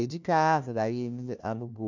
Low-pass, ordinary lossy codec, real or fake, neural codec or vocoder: 7.2 kHz; none; fake; codec, 16 kHz, 1 kbps, FunCodec, trained on LibriTTS, 50 frames a second